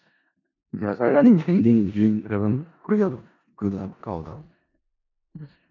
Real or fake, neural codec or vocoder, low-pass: fake; codec, 16 kHz in and 24 kHz out, 0.4 kbps, LongCat-Audio-Codec, four codebook decoder; 7.2 kHz